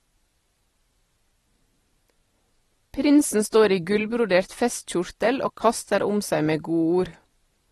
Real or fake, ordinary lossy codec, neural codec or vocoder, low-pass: real; AAC, 32 kbps; none; 14.4 kHz